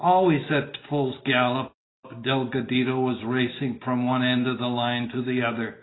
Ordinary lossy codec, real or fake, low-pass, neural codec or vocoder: AAC, 16 kbps; real; 7.2 kHz; none